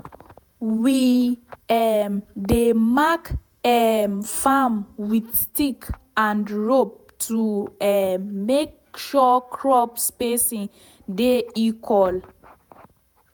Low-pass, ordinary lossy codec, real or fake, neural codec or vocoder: none; none; fake; vocoder, 48 kHz, 128 mel bands, Vocos